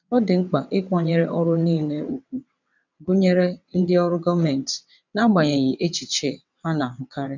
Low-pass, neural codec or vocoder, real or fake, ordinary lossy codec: 7.2 kHz; vocoder, 22.05 kHz, 80 mel bands, WaveNeXt; fake; none